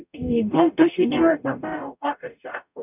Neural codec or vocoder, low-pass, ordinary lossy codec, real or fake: codec, 44.1 kHz, 0.9 kbps, DAC; 3.6 kHz; none; fake